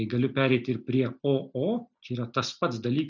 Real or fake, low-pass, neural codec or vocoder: real; 7.2 kHz; none